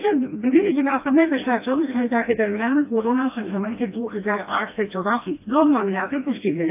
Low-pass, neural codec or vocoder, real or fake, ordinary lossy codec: 3.6 kHz; codec, 16 kHz, 1 kbps, FreqCodec, smaller model; fake; AAC, 32 kbps